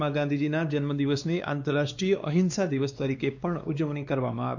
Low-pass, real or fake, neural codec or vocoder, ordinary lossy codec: 7.2 kHz; fake; codec, 16 kHz, 2 kbps, X-Codec, WavLM features, trained on Multilingual LibriSpeech; none